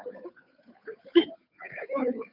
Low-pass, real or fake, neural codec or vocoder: 5.4 kHz; fake; codec, 16 kHz, 2 kbps, FunCodec, trained on Chinese and English, 25 frames a second